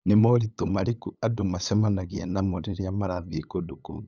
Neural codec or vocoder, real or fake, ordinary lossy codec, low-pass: codec, 16 kHz, 8 kbps, FunCodec, trained on LibriTTS, 25 frames a second; fake; none; 7.2 kHz